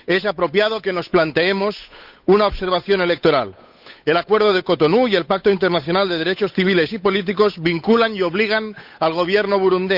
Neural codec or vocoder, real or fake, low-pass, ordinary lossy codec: codec, 16 kHz, 8 kbps, FunCodec, trained on Chinese and English, 25 frames a second; fake; 5.4 kHz; none